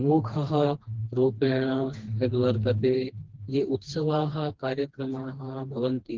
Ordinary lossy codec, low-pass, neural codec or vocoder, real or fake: Opus, 16 kbps; 7.2 kHz; codec, 16 kHz, 2 kbps, FreqCodec, smaller model; fake